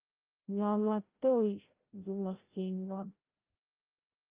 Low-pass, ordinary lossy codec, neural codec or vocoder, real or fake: 3.6 kHz; Opus, 32 kbps; codec, 16 kHz, 0.5 kbps, FreqCodec, larger model; fake